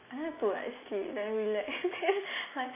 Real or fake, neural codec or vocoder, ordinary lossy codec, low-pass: real; none; MP3, 16 kbps; 3.6 kHz